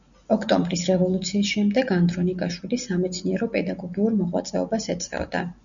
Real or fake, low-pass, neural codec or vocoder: real; 7.2 kHz; none